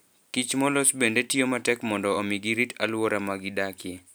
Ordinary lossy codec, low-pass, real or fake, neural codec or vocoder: none; none; real; none